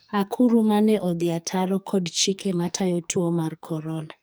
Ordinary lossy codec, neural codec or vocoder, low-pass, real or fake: none; codec, 44.1 kHz, 2.6 kbps, SNAC; none; fake